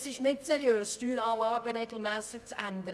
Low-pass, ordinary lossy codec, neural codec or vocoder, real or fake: none; none; codec, 24 kHz, 0.9 kbps, WavTokenizer, medium music audio release; fake